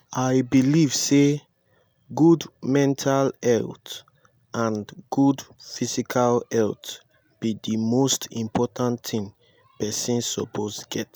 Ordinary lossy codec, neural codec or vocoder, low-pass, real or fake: none; none; none; real